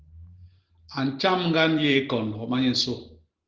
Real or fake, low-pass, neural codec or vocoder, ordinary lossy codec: real; 7.2 kHz; none; Opus, 16 kbps